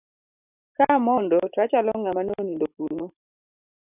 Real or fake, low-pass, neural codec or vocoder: fake; 3.6 kHz; vocoder, 44.1 kHz, 128 mel bands every 512 samples, BigVGAN v2